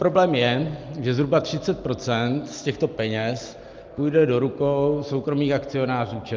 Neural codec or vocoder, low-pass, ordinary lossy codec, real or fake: none; 7.2 kHz; Opus, 24 kbps; real